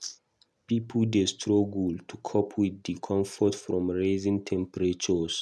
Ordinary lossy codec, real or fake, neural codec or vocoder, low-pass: none; real; none; none